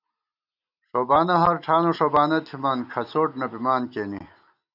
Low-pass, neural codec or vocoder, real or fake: 5.4 kHz; none; real